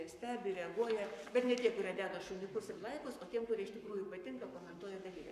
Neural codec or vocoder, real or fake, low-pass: codec, 44.1 kHz, 7.8 kbps, Pupu-Codec; fake; 14.4 kHz